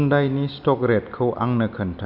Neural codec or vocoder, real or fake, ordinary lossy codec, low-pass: none; real; none; 5.4 kHz